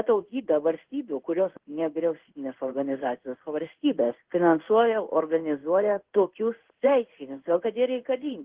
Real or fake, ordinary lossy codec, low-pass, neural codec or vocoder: fake; Opus, 16 kbps; 3.6 kHz; codec, 16 kHz in and 24 kHz out, 1 kbps, XY-Tokenizer